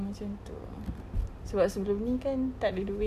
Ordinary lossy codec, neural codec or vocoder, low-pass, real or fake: none; none; 14.4 kHz; real